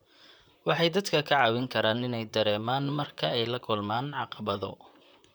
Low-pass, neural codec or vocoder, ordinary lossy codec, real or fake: none; vocoder, 44.1 kHz, 128 mel bands, Pupu-Vocoder; none; fake